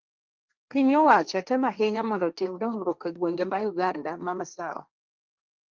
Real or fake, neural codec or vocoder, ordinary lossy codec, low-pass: fake; codec, 16 kHz, 1.1 kbps, Voila-Tokenizer; Opus, 24 kbps; 7.2 kHz